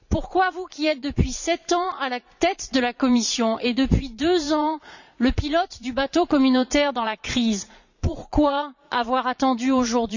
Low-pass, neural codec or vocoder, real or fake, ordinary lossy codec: 7.2 kHz; vocoder, 44.1 kHz, 80 mel bands, Vocos; fake; none